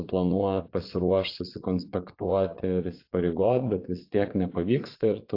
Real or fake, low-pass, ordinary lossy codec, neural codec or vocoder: fake; 5.4 kHz; AAC, 32 kbps; vocoder, 22.05 kHz, 80 mel bands, Vocos